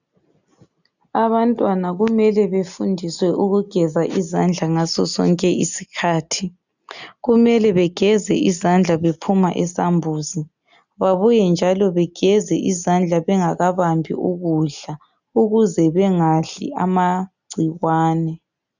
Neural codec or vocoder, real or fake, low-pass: none; real; 7.2 kHz